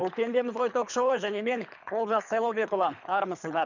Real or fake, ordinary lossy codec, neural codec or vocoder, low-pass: fake; none; codec, 24 kHz, 3 kbps, HILCodec; 7.2 kHz